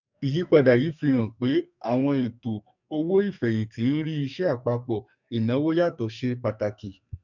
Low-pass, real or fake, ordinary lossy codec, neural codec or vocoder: 7.2 kHz; fake; none; codec, 44.1 kHz, 2.6 kbps, SNAC